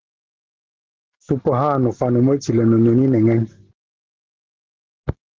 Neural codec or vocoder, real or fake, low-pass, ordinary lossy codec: none; real; 7.2 kHz; Opus, 16 kbps